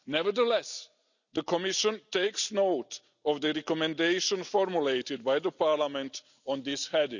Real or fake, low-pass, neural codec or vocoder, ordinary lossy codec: real; 7.2 kHz; none; none